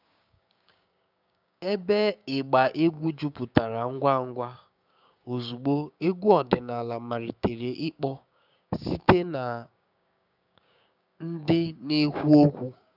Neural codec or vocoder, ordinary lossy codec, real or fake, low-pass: codec, 16 kHz, 6 kbps, DAC; none; fake; 5.4 kHz